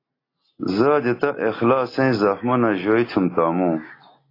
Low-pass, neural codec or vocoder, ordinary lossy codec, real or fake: 5.4 kHz; none; AAC, 24 kbps; real